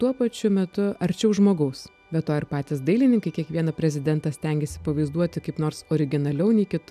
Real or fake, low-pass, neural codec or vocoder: real; 14.4 kHz; none